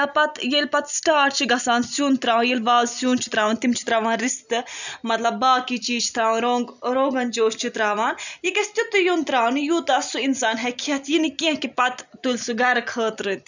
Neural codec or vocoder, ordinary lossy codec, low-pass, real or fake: none; none; 7.2 kHz; real